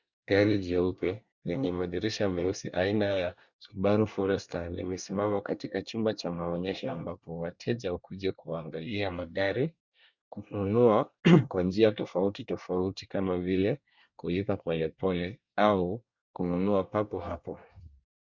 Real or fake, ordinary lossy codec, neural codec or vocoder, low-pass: fake; Opus, 64 kbps; codec, 24 kHz, 1 kbps, SNAC; 7.2 kHz